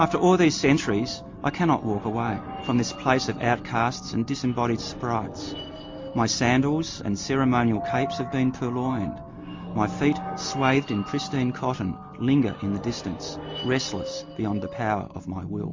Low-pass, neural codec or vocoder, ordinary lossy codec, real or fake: 7.2 kHz; none; MP3, 48 kbps; real